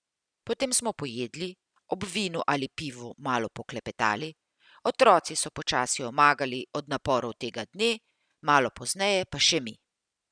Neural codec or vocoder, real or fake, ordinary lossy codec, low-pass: none; real; none; 9.9 kHz